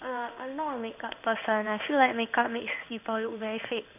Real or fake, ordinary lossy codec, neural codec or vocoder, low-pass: fake; none; codec, 16 kHz in and 24 kHz out, 1 kbps, XY-Tokenizer; 3.6 kHz